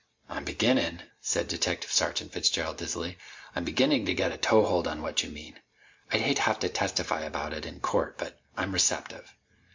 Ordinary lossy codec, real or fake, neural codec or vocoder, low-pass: MP3, 48 kbps; real; none; 7.2 kHz